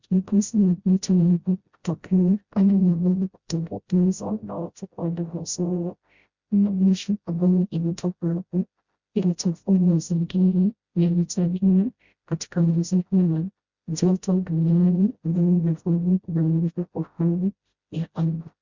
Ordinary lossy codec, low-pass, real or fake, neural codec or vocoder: Opus, 64 kbps; 7.2 kHz; fake; codec, 16 kHz, 0.5 kbps, FreqCodec, smaller model